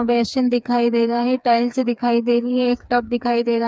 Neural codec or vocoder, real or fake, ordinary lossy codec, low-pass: codec, 16 kHz, 4 kbps, FreqCodec, smaller model; fake; none; none